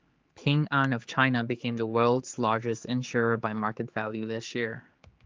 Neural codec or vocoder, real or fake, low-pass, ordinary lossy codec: codec, 16 kHz, 4 kbps, X-Codec, HuBERT features, trained on general audio; fake; 7.2 kHz; Opus, 32 kbps